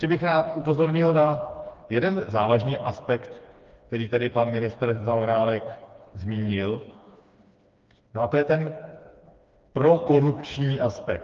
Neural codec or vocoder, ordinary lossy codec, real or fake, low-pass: codec, 16 kHz, 2 kbps, FreqCodec, smaller model; Opus, 24 kbps; fake; 7.2 kHz